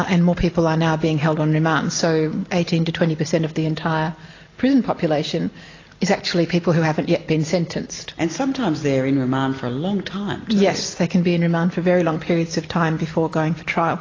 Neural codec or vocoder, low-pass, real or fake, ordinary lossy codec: none; 7.2 kHz; real; AAC, 32 kbps